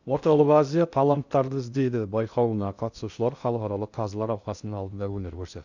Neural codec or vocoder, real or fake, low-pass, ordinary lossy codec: codec, 16 kHz in and 24 kHz out, 0.6 kbps, FocalCodec, streaming, 2048 codes; fake; 7.2 kHz; Opus, 64 kbps